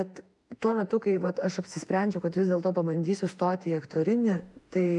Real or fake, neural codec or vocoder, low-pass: fake; autoencoder, 48 kHz, 32 numbers a frame, DAC-VAE, trained on Japanese speech; 10.8 kHz